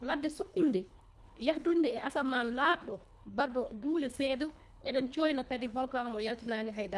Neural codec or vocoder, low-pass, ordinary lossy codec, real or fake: codec, 24 kHz, 1.5 kbps, HILCodec; none; none; fake